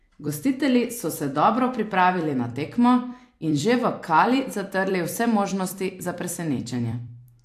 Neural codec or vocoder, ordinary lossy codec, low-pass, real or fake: vocoder, 44.1 kHz, 128 mel bands every 256 samples, BigVGAN v2; AAC, 64 kbps; 14.4 kHz; fake